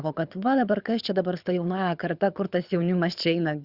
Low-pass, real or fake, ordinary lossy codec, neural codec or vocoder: 5.4 kHz; fake; Opus, 64 kbps; codec, 24 kHz, 6 kbps, HILCodec